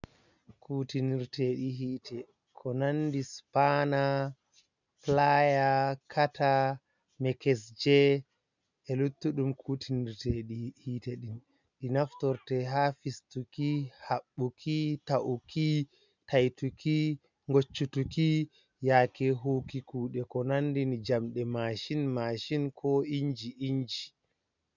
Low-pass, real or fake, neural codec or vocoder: 7.2 kHz; real; none